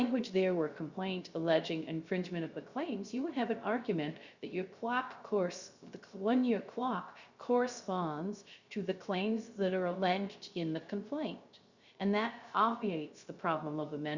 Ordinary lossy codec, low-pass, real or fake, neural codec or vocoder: Opus, 64 kbps; 7.2 kHz; fake; codec, 16 kHz, 0.3 kbps, FocalCodec